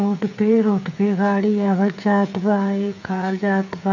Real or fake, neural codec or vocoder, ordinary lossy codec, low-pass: fake; codec, 16 kHz, 8 kbps, FreqCodec, smaller model; none; 7.2 kHz